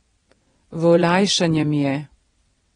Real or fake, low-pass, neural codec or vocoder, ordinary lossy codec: fake; 9.9 kHz; vocoder, 22.05 kHz, 80 mel bands, WaveNeXt; AAC, 32 kbps